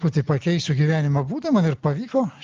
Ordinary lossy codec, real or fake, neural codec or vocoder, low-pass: Opus, 32 kbps; real; none; 7.2 kHz